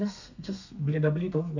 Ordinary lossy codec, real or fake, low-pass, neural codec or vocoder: none; fake; 7.2 kHz; codec, 32 kHz, 1.9 kbps, SNAC